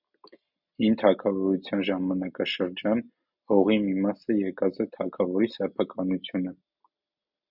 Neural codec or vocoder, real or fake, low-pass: none; real; 5.4 kHz